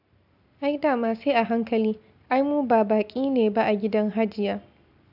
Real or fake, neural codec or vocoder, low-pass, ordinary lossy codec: real; none; 5.4 kHz; AAC, 48 kbps